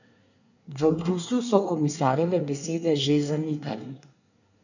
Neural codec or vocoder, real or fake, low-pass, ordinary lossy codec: codec, 24 kHz, 1 kbps, SNAC; fake; 7.2 kHz; none